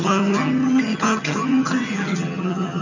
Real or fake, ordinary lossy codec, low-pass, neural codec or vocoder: fake; none; 7.2 kHz; vocoder, 22.05 kHz, 80 mel bands, HiFi-GAN